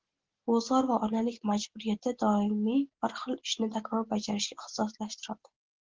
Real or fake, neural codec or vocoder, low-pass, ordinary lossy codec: real; none; 7.2 kHz; Opus, 16 kbps